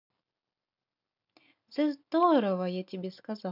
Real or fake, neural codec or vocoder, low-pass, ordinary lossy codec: real; none; 5.4 kHz; none